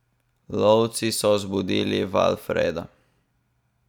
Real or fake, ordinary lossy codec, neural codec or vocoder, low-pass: real; none; none; 19.8 kHz